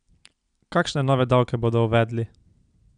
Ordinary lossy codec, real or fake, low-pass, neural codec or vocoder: none; real; 9.9 kHz; none